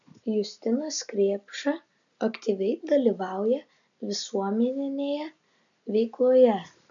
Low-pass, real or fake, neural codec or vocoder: 7.2 kHz; real; none